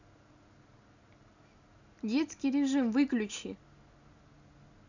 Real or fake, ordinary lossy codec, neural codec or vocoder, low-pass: real; none; none; 7.2 kHz